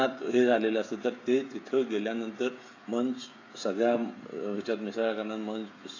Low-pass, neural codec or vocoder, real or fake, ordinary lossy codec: 7.2 kHz; codec, 16 kHz, 16 kbps, FreqCodec, smaller model; fake; AAC, 32 kbps